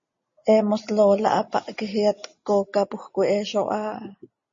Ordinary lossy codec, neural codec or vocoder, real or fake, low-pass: MP3, 32 kbps; none; real; 7.2 kHz